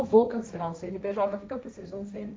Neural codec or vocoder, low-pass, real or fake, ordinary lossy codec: codec, 16 kHz, 1.1 kbps, Voila-Tokenizer; none; fake; none